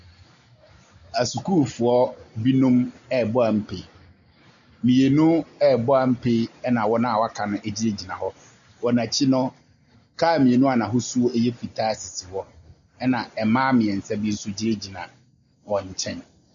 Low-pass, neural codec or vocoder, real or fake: 7.2 kHz; none; real